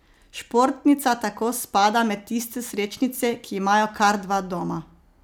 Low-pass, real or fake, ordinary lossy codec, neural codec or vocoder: none; real; none; none